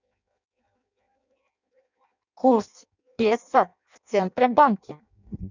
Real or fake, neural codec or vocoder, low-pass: fake; codec, 16 kHz in and 24 kHz out, 0.6 kbps, FireRedTTS-2 codec; 7.2 kHz